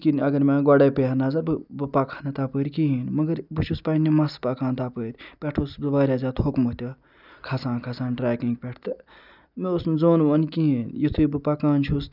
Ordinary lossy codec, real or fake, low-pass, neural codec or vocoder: none; real; 5.4 kHz; none